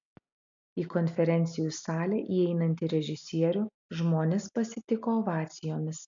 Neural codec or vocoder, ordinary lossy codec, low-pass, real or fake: none; MP3, 64 kbps; 7.2 kHz; real